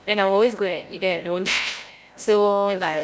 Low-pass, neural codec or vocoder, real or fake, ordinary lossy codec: none; codec, 16 kHz, 0.5 kbps, FreqCodec, larger model; fake; none